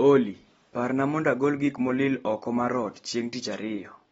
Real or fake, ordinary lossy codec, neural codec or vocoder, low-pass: real; AAC, 24 kbps; none; 19.8 kHz